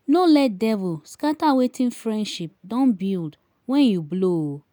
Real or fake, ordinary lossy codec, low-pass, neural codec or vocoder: real; none; none; none